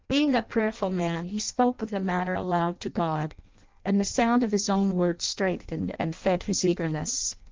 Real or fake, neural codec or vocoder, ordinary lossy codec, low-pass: fake; codec, 16 kHz in and 24 kHz out, 0.6 kbps, FireRedTTS-2 codec; Opus, 32 kbps; 7.2 kHz